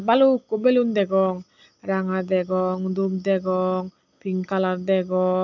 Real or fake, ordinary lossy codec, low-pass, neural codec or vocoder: real; none; 7.2 kHz; none